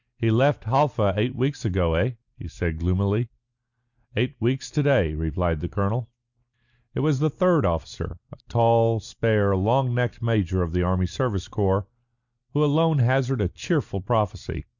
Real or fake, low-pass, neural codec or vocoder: real; 7.2 kHz; none